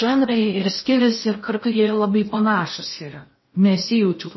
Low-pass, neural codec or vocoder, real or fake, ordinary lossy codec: 7.2 kHz; codec, 16 kHz in and 24 kHz out, 0.6 kbps, FocalCodec, streaming, 4096 codes; fake; MP3, 24 kbps